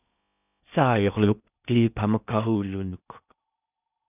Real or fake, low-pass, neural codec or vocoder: fake; 3.6 kHz; codec, 16 kHz in and 24 kHz out, 0.6 kbps, FocalCodec, streaming, 4096 codes